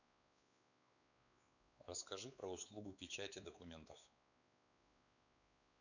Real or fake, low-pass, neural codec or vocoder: fake; 7.2 kHz; codec, 16 kHz, 4 kbps, X-Codec, WavLM features, trained on Multilingual LibriSpeech